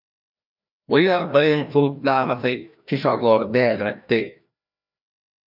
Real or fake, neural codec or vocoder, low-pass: fake; codec, 16 kHz, 1 kbps, FreqCodec, larger model; 5.4 kHz